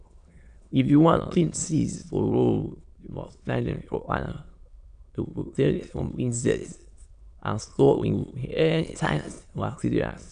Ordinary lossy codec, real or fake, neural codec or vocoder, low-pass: none; fake; autoencoder, 22.05 kHz, a latent of 192 numbers a frame, VITS, trained on many speakers; 9.9 kHz